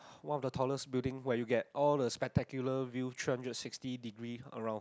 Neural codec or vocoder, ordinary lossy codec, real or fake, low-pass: none; none; real; none